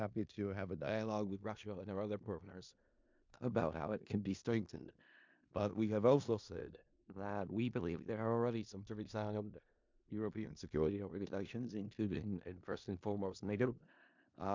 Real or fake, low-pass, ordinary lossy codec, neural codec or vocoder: fake; 7.2 kHz; MP3, 64 kbps; codec, 16 kHz in and 24 kHz out, 0.4 kbps, LongCat-Audio-Codec, four codebook decoder